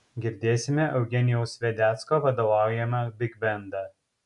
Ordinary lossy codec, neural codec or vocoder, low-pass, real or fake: MP3, 96 kbps; none; 10.8 kHz; real